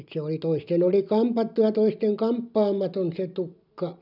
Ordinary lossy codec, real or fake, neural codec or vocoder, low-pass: none; real; none; 5.4 kHz